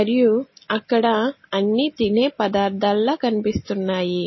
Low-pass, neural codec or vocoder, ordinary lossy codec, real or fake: 7.2 kHz; none; MP3, 24 kbps; real